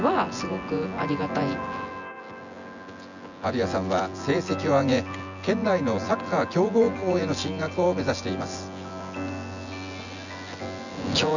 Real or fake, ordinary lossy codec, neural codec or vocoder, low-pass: fake; none; vocoder, 24 kHz, 100 mel bands, Vocos; 7.2 kHz